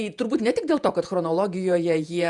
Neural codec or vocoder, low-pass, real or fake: vocoder, 48 kHz, 128 mel bands, Vocos; 10.8 kHz; fake